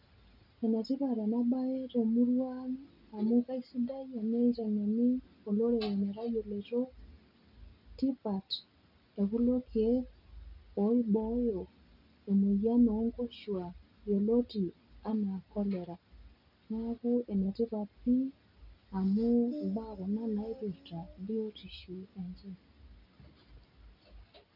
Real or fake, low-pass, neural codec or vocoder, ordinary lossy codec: real; 5.4 kHz; none; none